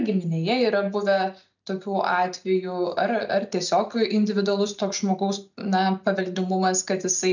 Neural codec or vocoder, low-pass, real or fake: none; 7.2 kHz; real